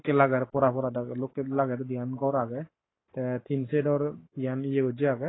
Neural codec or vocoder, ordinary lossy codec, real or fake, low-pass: codec, 44.1 kHz, 7.8 kbps, Pupu-Codec; AAC, 16 kbps; fake; 7.2 kHz